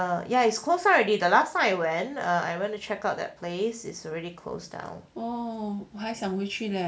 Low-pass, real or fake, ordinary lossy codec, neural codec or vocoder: none; real; none; none